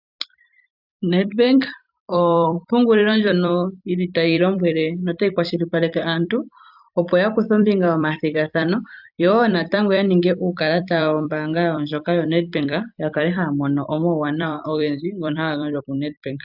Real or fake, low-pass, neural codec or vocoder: fake; 5.4 kHz; vocoder, 44.1 kHz, 128 mel bands every 256 samples, BigVGAN v2